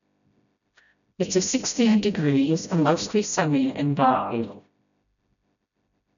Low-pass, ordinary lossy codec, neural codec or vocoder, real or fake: 7.2 kHz; none; codec, 16 kHz, 0.5 kbps, FreqCodec, smaller model; fake